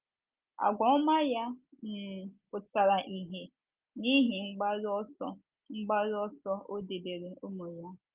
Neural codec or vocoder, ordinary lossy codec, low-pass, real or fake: none; Opus, 32 kbps; 3.6 kHz; real